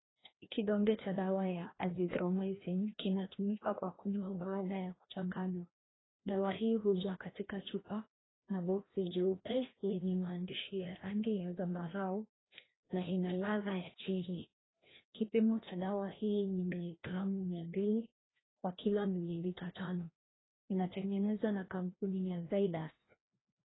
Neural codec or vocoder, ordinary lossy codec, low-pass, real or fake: codec, 16 kHz, 1 kbps, FreqCodec, larger model; AAC, 16 kbps; 7.2 kHz; fake